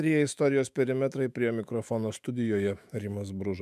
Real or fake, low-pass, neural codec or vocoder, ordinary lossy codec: fake; 14.4 kHz; autoencoder, 48 kHz, 128 numbers a frame, DAC-VAE, trained on Japanese speech; MP3, 96 kbps